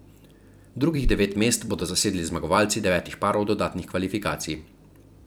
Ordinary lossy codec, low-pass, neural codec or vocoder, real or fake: none; none; vocoder, 44.1 kHz, 128 mel bands every 256 samples, BigVGAN v2; fake